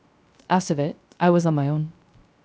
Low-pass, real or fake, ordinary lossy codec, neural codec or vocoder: none; fake; none; codec, 16 kHz, 0.3 kbps, FocalCodec